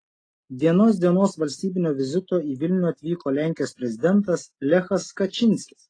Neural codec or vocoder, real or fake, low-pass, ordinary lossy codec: none; real; 9.9 kHz; AAC, 32 kbps